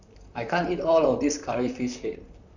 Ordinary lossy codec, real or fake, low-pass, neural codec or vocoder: none; fake; 7.2 kHz; vocoder, 44.1 kHz, 128 mel bands, Pupu-Vocoder